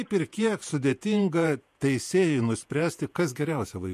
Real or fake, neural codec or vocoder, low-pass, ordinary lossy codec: fake; vocoder, 48 kHz, 128 mel bands, Vocos; 14.4 kHz; MP3, 64 kbps